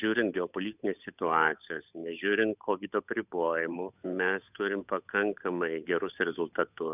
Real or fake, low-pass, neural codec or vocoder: fake; 3.6 kHz; codec, 16 kHz, 8 kbps, FunCodec, trained on Chinese and English, 25 frames a second